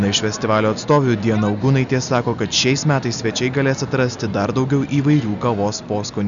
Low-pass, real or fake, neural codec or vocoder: 7.2 kHz; real; none